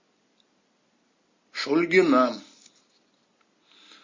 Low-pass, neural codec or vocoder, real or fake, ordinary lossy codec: 7.2 kHz; none; real; MP3, 32 kbps